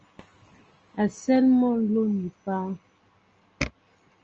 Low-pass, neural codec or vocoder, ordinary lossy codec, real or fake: 7.2 kHz; none; Opus, 24 kbps; real